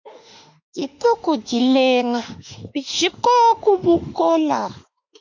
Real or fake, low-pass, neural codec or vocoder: fake; 7.2 kHz; autoencoder, 48 kHz, 32 numbers a frame, DAC-VAE, trained on Japanese speech